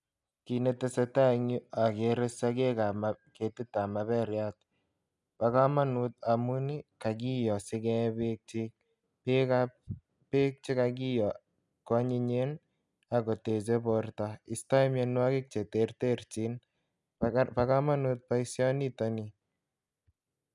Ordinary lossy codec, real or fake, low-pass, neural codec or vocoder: none; real; 10.8 kHz; none